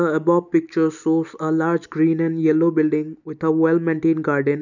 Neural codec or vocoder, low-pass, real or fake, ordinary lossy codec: none; 7.2 kHz; real; none